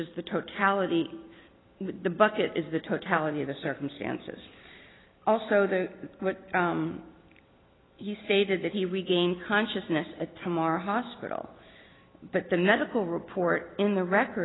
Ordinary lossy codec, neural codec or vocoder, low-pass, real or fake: AAC, 16 kbps; none; 7.2 kHz; real